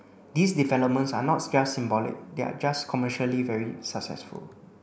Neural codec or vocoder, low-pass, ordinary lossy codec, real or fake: none; none; none; real